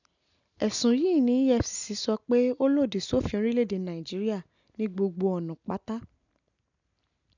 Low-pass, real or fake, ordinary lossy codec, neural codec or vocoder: 7.2 kHz; real; none; none